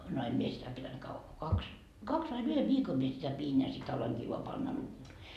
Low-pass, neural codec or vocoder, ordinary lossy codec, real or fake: 14.4 kHz; none; none; real